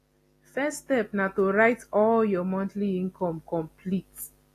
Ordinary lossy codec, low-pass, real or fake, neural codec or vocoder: AAC, 48 kbps; 14.4 kHz; real; none